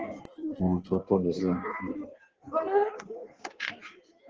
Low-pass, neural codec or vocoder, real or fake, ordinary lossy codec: 7.2 kHz; codec, 16 kHz in and 24 kHz out, 1.1 kbps, FireRedTTS-2 codec; fake; Opus, 16 kbps